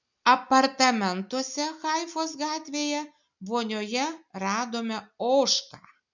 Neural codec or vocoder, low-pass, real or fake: none; 7.2 kHz; real